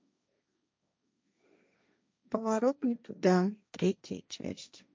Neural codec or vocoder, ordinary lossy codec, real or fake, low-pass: codec, 16 kHz, 1.1 kbps, Voila-Tokenizer; none; fake; none